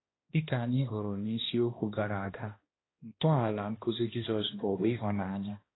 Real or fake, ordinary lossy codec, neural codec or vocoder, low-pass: fake; AAC, 16 kbps; codec, 16 kHz, 1 kbps, X-Codec, HuBERT features, trained on balanced general audio; 7.2 kHz